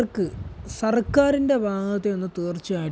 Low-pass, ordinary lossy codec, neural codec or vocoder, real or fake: none; none; none; real